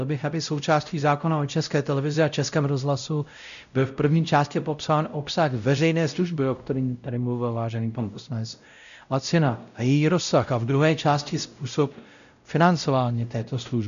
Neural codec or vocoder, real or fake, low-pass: codec, 16 kHz, 0.5 kbps, X-Codec, WavLM features, trained on Multilingual LibriSpeech; fake; 7.2 kHz